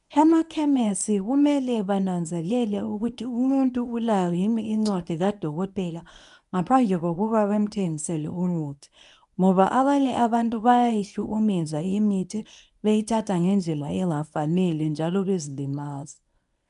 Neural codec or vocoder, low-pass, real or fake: codec, 24 kHz, 0.9 kbps, WavTokenizer, medium speech release version 1; 10.8 kHz; fake